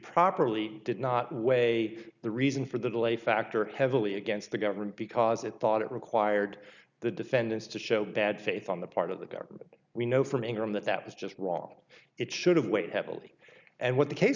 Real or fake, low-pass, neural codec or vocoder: real; 7.2 kHz; none